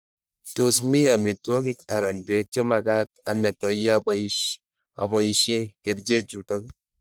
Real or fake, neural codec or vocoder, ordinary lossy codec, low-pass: fake; codec, 44.1 kHz, 1.7 kbps, Pupu-Codec; none; none